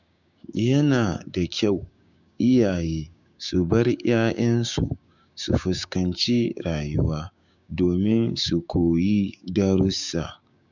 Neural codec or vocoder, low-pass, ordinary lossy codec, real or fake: codec, 16 kHz, 6 kbps, DAC; 7.2 kHz; none; fake